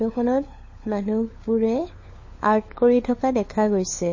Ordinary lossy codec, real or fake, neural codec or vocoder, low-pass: MP3, 32 kbps; fake; codec, 16 kHz, 4 kbps, FunCodec, trained on LibriTTS, 50 frames a second; 7.2 kHz